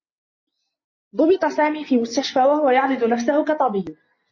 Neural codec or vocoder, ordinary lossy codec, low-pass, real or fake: none; MP3, 32 kbps; 7.2 kHz; real